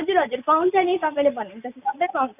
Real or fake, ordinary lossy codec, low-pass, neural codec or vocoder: real; none; 3.6 kHz; none